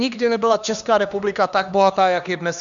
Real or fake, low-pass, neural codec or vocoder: fake; 7.2 kHz; codec, 16 kHz, 2 kbps, X-Codec, HuBERT features, trained on LibriSpeech